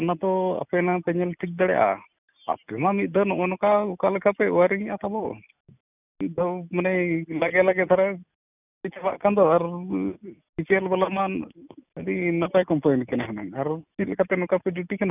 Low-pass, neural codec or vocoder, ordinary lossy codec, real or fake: 3.6 kHz; none; none; real